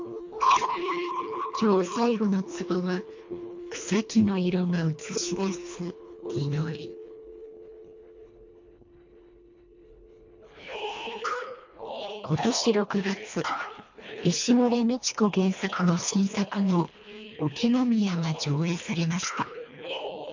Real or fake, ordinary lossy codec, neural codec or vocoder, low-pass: fake; MP3, 48 kbps; codec, 24 kHz, 1.5 kbps, HILCodec; 7.2 kHz